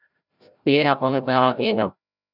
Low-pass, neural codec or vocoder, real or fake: 5.4 kHz; codec, 16 kHz, 0.5 kbps, FreqCodec, larger model; fake